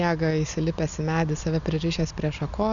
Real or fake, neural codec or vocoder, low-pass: real; none; 7.2 kHz